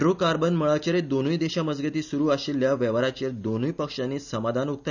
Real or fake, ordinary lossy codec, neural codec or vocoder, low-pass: real; none; none; 7.2 kHz